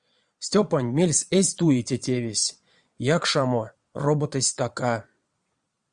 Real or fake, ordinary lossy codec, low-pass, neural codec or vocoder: fake; Opus, 64 kbps; 9.9 kHz; vocoder, 22.05 kHz, 80 mel bands, Vocos